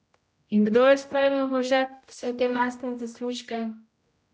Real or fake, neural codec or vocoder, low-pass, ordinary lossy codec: fake; codec, 16 kHz, 0.5 kbps, X-Codec, HuBERT features, trained on general audio; none; none